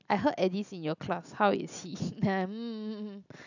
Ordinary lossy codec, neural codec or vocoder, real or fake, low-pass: none; none; real; 7.2 kHz